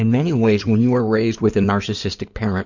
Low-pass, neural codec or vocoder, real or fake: 7.2 kHz; codec, 16 kHz in and 24 kHz out, 2.2 kbps, FireRedTTS-2 codec; fake